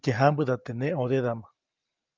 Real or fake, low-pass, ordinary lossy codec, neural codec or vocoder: real; 7.2 kHz; Opus, 24 kbps; none